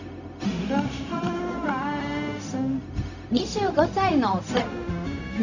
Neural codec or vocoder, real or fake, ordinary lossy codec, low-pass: codec, 16 kHz, 0.4 kbps, LongCat-Audio-Codec; fake; none; 7.2 kHz